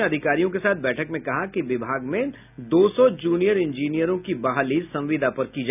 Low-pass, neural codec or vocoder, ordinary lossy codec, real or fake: 3.6 kHz; none; none; real